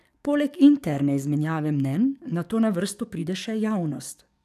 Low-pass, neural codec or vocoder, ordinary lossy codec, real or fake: 14.4 kHz; codec, 44.1 kHz, 7.8 kbps, DAC; none; fake